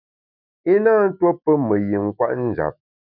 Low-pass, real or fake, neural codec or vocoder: 5.4 kHz; fake; autoencoder, 48 kHz, 128 numbers a frame, DAC-VAE, trained on Japanese speech